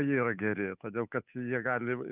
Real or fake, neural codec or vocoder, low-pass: fake; codec, 16 kHz, 8 kbps, FunCodec, trained on Chinese and English, 25 frames a second; 3.6 kHz